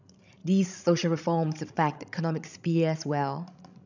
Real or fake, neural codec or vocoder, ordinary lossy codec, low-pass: fake; codec, 16 kHz, 16 kbps, FreqCodec, larger model; none; 7.2 kHz